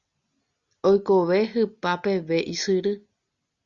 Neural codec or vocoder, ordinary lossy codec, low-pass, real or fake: none; Opus, 64 kbps; 7.2 kHz; real